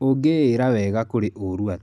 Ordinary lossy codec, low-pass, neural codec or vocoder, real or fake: none; 14.4 kHz; none; real